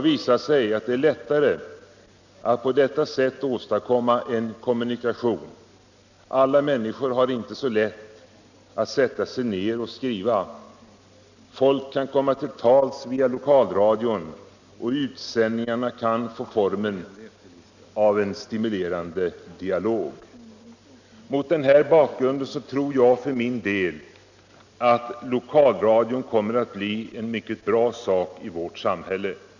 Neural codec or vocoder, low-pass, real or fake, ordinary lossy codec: none; 7.2 kHz; real; none